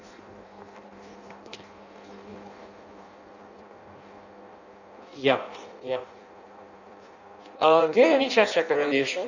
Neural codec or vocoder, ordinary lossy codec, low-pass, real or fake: codec, 16 kHz in and 24 kHz out, 0.6 kbps, FireRedTTS-2 codec; none; 7.2 kHz; fake